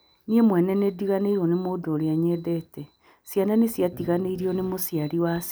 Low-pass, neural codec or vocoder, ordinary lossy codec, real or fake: none; none; none; real